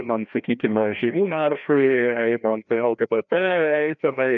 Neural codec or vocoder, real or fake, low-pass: codec, 16 kHz, 1 kbps, FreqCodec, larger model; fake; 7.2 kHz